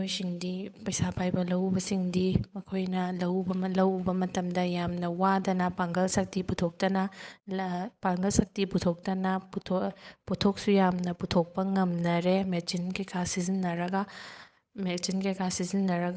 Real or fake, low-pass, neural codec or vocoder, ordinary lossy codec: fake; none; codec, 16 kHz, 8 kbps, FunCodec, trained on Chinese and English, 25 frames a second; none